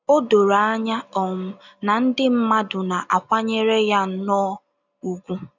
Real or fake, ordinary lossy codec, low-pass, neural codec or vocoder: real; none; 7.2 kHz; none